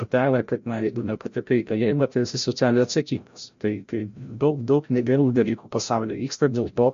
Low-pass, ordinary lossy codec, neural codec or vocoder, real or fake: 7.2 kHz; MP3, 48 kbps; codec, 16 kHz, 0.5 kbps, FreqCodec, larger model; fake